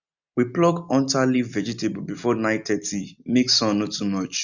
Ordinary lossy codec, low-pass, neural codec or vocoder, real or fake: none; 7.2 kHz; none; real